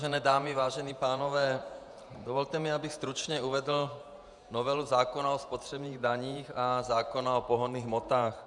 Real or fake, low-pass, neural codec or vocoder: fake; 10.8 kHz; vocoder, 48 kHz, 128 mel bands, Vocos